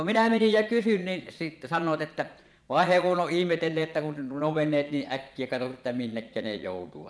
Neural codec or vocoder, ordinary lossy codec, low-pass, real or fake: vocoder, 22.05 kHz, 80 mel bands, Vocos; none; none; fake